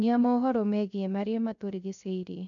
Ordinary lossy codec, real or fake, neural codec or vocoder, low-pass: none; fake; codec, 16 kHz, 0.3 kbps, FocalCodec; 7.2 kHz